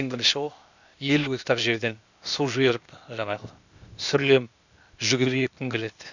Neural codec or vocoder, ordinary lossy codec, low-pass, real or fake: codec, 16 kHz, 0.8 kbps, ZipCodec; none; 7.2 kHz; fake